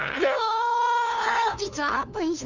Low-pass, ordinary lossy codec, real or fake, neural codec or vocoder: 7.2 kHz; none; fake; codec, 16 kHz, 2 kbps, FunCodec, trained on LibriTTS, 25 frames a second